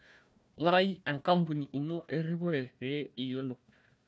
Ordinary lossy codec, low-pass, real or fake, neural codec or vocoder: none; none; fake; codec, 16 kHz, 1 kbps, FunCodec, trained on Chinese and English, 50 frames a second